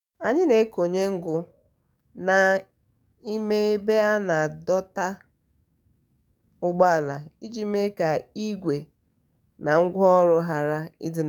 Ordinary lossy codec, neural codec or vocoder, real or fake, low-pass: none; codec, 44.1 kHz, 7.8 kbps, DAC; fake; 19.8 kHz